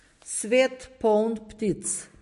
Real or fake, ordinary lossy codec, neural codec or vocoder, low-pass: real; MP3, 48 kbps; none; 14.4 kHz